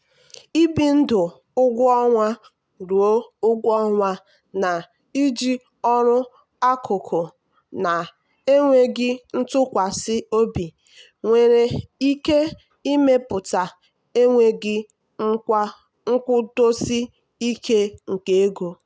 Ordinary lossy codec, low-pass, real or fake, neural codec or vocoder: none; none; real; none